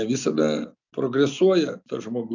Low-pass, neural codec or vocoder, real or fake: 7.2 kHz; none; real